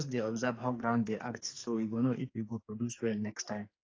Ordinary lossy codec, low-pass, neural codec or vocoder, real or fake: AAC, 32 kbps; 7.2 kHz; codec, 44.1 kHz, 2.6 kbps, SNAC; fake